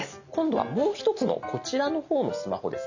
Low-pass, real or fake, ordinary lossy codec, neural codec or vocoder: 7.2 kHz; real; none; none